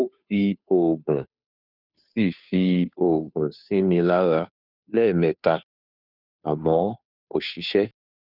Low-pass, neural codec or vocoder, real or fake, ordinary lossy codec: 5.4 kHz; codec, 16 kHz, 2 kbps, FunCodec, trained on Chinese and English, 25 frames a second; fake; none